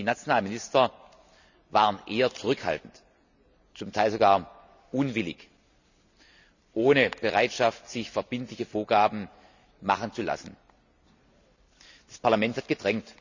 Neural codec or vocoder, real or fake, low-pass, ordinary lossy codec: none; real; 7.2 kHz; none